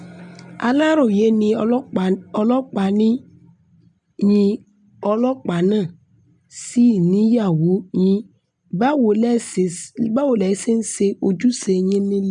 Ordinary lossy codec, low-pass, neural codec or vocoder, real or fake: none; 9.9 kHz; none; real